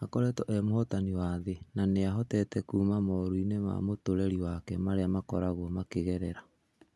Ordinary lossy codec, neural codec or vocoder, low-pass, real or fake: none; none; none; real